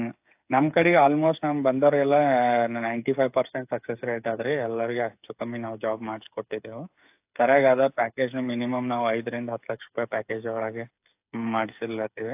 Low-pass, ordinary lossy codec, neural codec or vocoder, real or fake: 3.6 kHz; AAC, 32 kbps; codec, 16 kHz, 8 kbps, FreqCodec, smaller model; fake